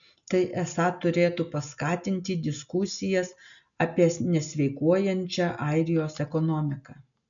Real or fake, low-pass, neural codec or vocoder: real; 7.2 kHz; none